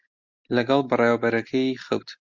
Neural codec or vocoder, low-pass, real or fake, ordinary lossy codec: none; 7.2 kHz; real; MP3, 64 kbps